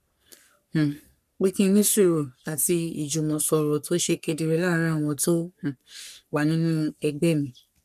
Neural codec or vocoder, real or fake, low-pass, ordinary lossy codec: codec, 44.1 kHz, 3.4 kbps, Pupu-Codec; fake; 14.4 kHz; none